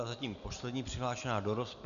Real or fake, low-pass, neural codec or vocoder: real; 7.2 kHz; none